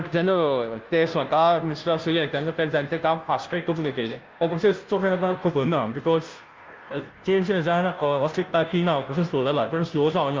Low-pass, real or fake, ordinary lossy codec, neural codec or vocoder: 7.2 kHz; fake; Opus, 32 kbps; codec, 16 kHz, 0.5 kbps, FunCodec, trained on Chinese and English, 25 frames a second